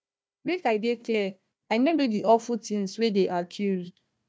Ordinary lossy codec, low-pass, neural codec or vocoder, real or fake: none; none; codec, 16 kHz, 1 kbps, FunCodec, trained on Chinese and English, 50 frames a second; fake